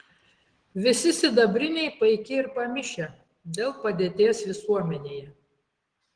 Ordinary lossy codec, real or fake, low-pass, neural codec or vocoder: Opus, 16 kbps; real; 9.9 kHz; none